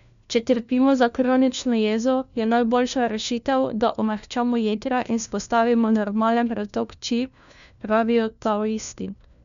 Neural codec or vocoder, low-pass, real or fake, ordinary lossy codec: codec, 16 kHz, 1 kbps, FunCodec, trained on LibriTTS, 50 frames a second; 7.2 kHz; fake; none